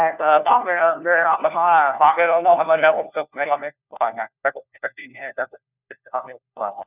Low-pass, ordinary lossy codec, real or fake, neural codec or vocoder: 3.6 kHz; none; fake; codec, 16 kHz, 1 kbps, FunCodec, trained on LibriTTS, 50 frames a second